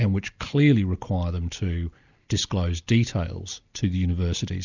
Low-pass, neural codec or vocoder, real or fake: 7.2 kHz; none; real